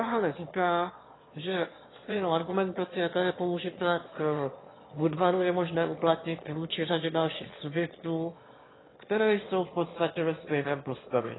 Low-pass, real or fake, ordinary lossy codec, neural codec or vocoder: 7.2 kHz; fake; AAC, 16 kbps; autoencoder, 22.05 kHz, a latent of 192 numbers a frame, VITS, trained on one speaker